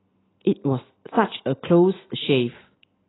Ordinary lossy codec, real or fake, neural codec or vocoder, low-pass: AAC, 16 kbps; real; none; 7.2 kHz